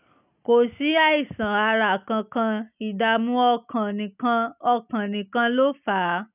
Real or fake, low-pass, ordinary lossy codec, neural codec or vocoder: real; 3.6 kHz; none; none